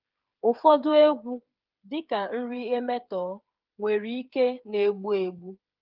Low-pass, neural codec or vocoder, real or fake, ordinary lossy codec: 5.4 kHz; codec, 16 kHz, 8 kbps, FreqCodec, smaller model; fake; Opus, 32 kbps